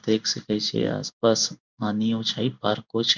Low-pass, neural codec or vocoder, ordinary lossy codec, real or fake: 7.2 kHz; codec, 16 kHz in and 24 kHz out, 1 kbps, XY-Tokenizer; none; fake